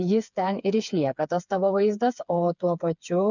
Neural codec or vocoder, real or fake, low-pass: codec, 16 kHz, 4 kbps, FreqCodec, smaller model; fake; 7.2 kHz